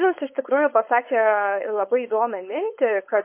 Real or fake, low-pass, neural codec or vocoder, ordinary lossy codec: fake; 3.6 kHz; codec, 16 kHz, 4.8 kbps, FACodec; MP3, 24 kbps